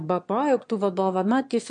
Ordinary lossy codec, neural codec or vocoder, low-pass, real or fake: MP3, 48 kbps; autoencoder, 22.05 kHz, a latent of 192 numbers a frame, VITS, trained on one speaker; 9.9 kHz; fake